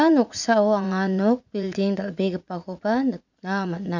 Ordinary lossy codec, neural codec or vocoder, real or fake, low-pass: none; vocoder, 44.1 kHz, 80 mel bands, Vocos; fake; 7.2 kHz